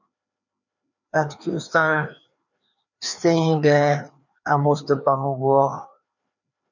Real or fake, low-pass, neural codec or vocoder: fake; 7.2 kHz; codec, 16 kHz, 2 kbps, FreqCodec, larger model